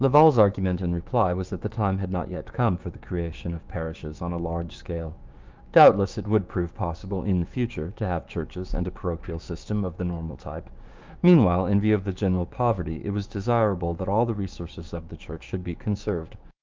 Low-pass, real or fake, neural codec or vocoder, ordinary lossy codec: 7.2 kHz; fake; codec, 16 kHz, 6 kbps, DAC; Opus, 32 kbps